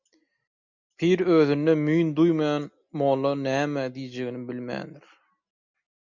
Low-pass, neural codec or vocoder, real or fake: 7.2 kHz; none; real